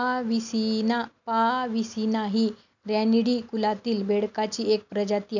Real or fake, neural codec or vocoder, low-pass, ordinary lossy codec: real; none; 7.2 kHz; none